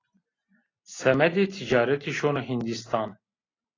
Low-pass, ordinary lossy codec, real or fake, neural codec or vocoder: 7.2 kHz; AAC, 32 kbps; real; none